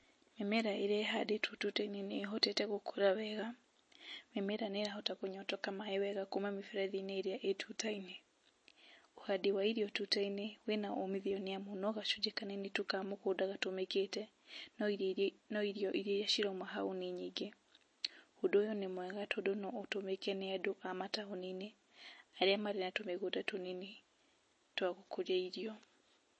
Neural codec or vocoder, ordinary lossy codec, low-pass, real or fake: none; MP3, 32 kbps; 9.9 kHz; real